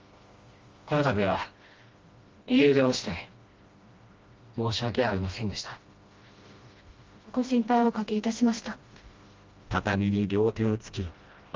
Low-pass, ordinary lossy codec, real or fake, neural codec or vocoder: 7.2 kHz; Opus, 32 kbps; fake; codec, 16 kHz, 1 kbps, FreqCodec, smaller model